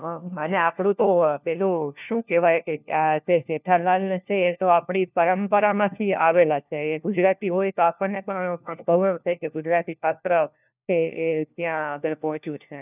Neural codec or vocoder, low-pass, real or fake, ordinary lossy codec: codec, 16 kHz, 1 kbps, FunCodec, trained on LibriTTS, 50 frames a second; 3.6 kHz; fake; none